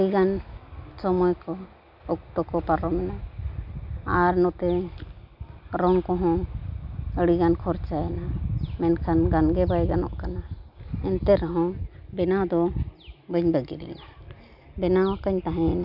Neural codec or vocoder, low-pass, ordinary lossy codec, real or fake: none; 5.4 kHz; none; real